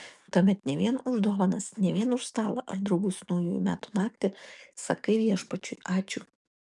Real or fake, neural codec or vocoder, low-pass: fake; codec, 44.1 kHz, 7.8 kbps, DAC; 10.8 kHz